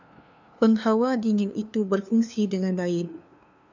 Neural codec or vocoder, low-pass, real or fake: codec, 16 kHz, 2 kbps, FunCodec, trained on LibriTTS, 25 frames a second; 7.2 kHz; fake